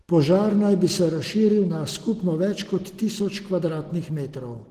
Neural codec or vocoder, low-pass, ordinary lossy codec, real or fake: none; 14.4 kHz; Opus, 16 kbps; real